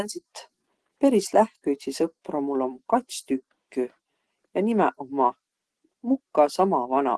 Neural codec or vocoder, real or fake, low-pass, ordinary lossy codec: none; real; 10.8 kHz; Opus, 16 kbps